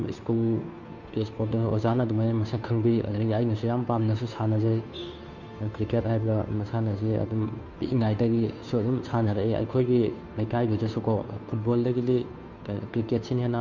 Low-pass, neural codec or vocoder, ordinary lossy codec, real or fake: 7.2 kHz; codec, 16 kHz, 2 kbps, FunCodec, trained on Chinese and English, 25 frames a second; Opus, 64 kbps; fake